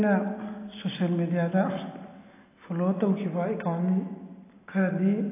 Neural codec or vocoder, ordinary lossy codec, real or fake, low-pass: none; AAC, 24 kbps; real; 3.6 kHz